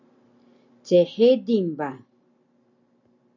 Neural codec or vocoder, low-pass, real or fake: none; 7.2 kHz; real